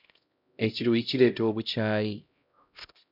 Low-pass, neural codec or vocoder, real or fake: 5.4 kHz; codec, 16 kHz, 0.5 kbps, X-Codec, HuBERT features, trained on LibriSpeech; fake